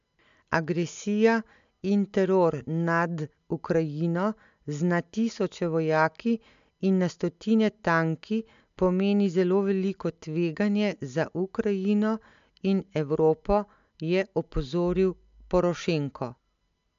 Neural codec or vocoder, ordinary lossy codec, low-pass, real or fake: none; MP3, 64 kbps; 7.2 kHz; real